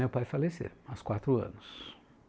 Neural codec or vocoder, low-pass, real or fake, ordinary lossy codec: none; none; real; none